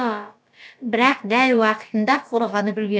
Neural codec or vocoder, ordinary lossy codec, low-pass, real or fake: codec, 16 kHz, about 1 kbps, DyCAST, with the encoder's durations; none; none; fake